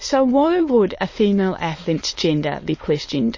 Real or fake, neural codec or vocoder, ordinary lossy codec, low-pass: fake; autoencoder, 22.05 kHz, a latent of 192 numbers a frame, VITS, trained on many speakers; MP3, 32 kbps; 7.2 kHz